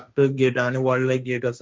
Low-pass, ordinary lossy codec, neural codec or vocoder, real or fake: none; none; codec, 16 kHz, 1.1 kbps, Voila-Tokenizer; fake